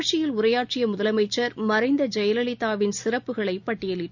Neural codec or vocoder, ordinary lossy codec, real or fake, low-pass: none; none; real; 7.2 kHz